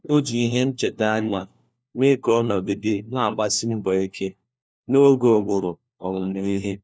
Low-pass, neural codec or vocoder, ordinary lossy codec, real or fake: none; codec, 16 kHz, 1 kbps, FunCodec, trained on LibriTTS, 50 frames a second; none; fake